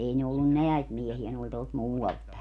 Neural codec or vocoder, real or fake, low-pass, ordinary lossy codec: none; real; none; none